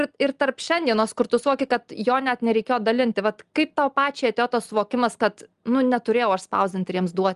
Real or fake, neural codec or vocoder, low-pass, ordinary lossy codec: real; none; 10.8 kHz; Opus, 32 kbps